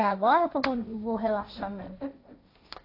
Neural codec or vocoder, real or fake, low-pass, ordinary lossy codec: codec, 16 kHz, 1.1 kbps, Voila-Tokenizer; fake; 5.4 kHz; AAC, 32 kbps